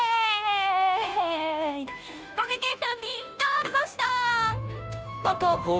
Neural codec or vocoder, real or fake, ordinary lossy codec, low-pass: codec, 16 kHz, 0.5 kbps, FunCodec, trained on Chinese and English, 25 frames a second; fake; none; none